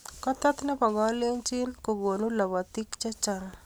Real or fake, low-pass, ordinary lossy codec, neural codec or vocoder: real; none; none; none